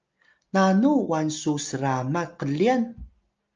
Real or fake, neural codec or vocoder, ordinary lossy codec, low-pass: real; none; Opus, 24 kbps; 7.2 kHz